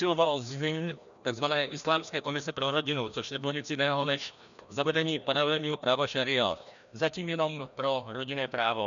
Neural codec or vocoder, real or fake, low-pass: codec, 16 kHz, 1 kbps, FreqCodec, larger model; fake; 7.2 kHz